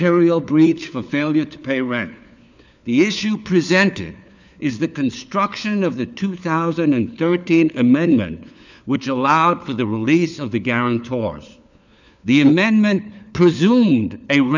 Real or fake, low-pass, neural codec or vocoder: fake; 7.2 kHz; codec, 16 kHz, 4 kbps, FunCodec, trained on LibriTTS, 50 frames a second